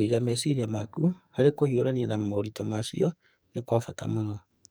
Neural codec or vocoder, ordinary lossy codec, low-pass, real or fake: codec, 44.1 kHz, 2.6 kbps, SNAC; none; none; fake